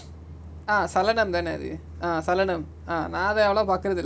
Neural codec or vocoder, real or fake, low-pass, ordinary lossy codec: none; real; none; none